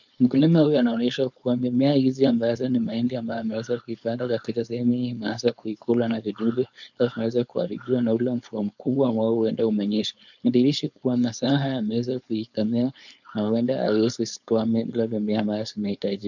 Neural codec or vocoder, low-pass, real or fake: codec, 16 kHz, 4.8 kbps, FACodec; 7.2 kHz; fake